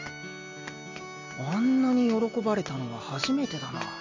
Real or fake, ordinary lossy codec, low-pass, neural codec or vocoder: real; MP3, 48 kbps; 7.2 kHz; none